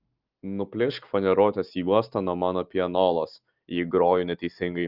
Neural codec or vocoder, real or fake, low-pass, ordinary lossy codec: none; real; 5.4 kHz; Opus, 32 kbps